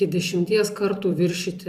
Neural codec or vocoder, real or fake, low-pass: vocoder, 44.1 kHz, 128 mel bands every 256 samples, BigVGAN v2; fake; 14.4 kHz